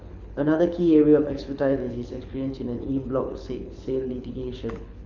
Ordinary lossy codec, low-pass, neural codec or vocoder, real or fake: none; 7.2 kHz; codec, 24 kHz, 6 kbps, HILCodec; fake